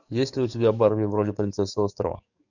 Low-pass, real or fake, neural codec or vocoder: 7.2 kHz; fake; codec, 44.1 kHz, 7.8 kbps, DAC